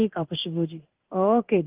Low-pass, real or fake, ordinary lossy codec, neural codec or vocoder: 3.6 kHz; fake; Opus, 32 kbps; codec, 16 kHz in and 24 kHz out, 1 kbps, XY-Tokenizer